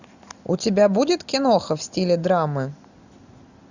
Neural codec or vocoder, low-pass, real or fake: none; 7.2 kHz; real